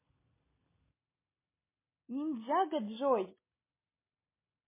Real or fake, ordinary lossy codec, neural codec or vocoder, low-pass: real; MP3, 16 kbps; none; 3.6 kHz